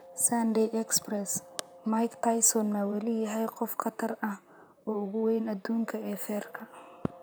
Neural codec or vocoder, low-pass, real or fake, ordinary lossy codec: vocoder, 44.1 kHz, 128 mel bands, Pupu-Vocoder; none; fake; none